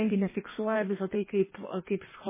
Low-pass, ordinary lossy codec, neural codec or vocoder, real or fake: 3.6 kHz; MP3, 16 kbps; codec, 16 kHz in and 24 kHz out, 1.1 kbps, FireRedTTS-2 codec; fake